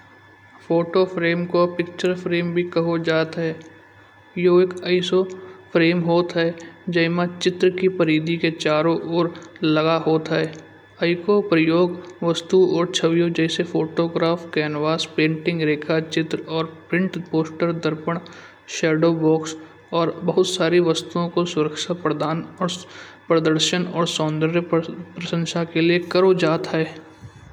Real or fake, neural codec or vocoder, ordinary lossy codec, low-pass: real; none; none; 19.8 kHz